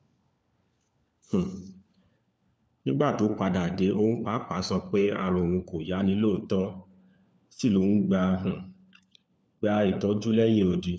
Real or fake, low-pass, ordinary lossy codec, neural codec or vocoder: fake; none; none; codec, 16 kHz, 4 kbps, FunCodec, trained on LibriTTS, 50 frames a second